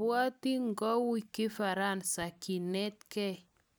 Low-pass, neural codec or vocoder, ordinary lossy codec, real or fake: none; none; none; real